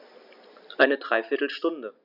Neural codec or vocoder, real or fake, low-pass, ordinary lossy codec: none; real; 5.4 kHz; none